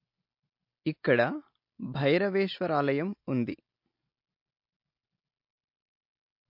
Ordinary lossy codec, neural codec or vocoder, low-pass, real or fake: MP3, 32 kbps; none; 5.4 kHz; real